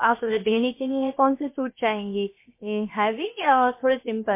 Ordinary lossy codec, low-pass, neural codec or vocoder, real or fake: MP3, 24 kbps; 3.6 kHz; codec, 16 kHz, 0.7 kbps, FocalCodec; fake